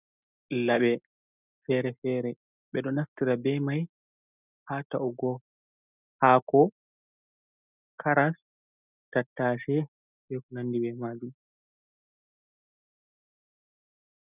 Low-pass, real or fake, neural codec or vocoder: 3.6 kHz; real; none